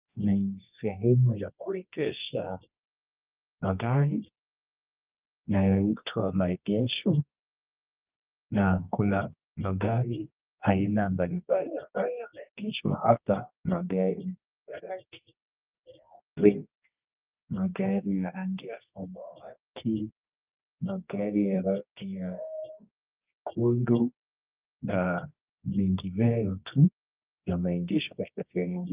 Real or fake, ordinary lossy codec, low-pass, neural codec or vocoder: fake; Opus, 24 kbps; 3.6 kHz; codec, 24 kHz, 0.9 kbps, WavTokenizer, medium music audio release